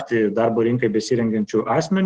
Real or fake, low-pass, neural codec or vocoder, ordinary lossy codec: real; 9.9 kHz; none; Opus, 16 kbps